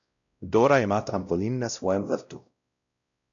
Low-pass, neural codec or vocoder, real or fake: 7.2 kHz; codec, 16 kHz, 0.5 kbps, X-Codec, WavLM features, trained on Multilingual LibriSpeech; fake